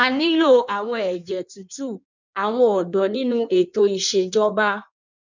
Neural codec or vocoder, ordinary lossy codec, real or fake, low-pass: codec, 16 kHz in and 24 kHz out, 1.1 kbps, FireRedTTS-2 codec; none; fake; 7.2 kHz